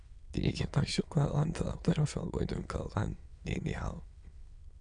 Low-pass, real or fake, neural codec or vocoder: 9.9 kHz; fake; autoencoder, 22.05 kHz, a latent of 192 numbers a frame, VITS, trained on many speakers